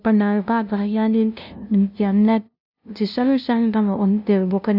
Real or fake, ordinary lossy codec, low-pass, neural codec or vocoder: fake; none; 5.4 kHz; codec, 16 kHz, 0.5 kbps, FunCodec, trained on LibriTTS, 25 frames a second